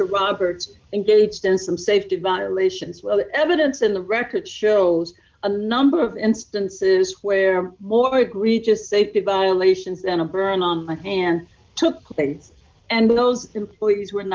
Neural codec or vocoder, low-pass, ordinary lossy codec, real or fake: none; 7.2 kHz; Opus, 32 kbps; real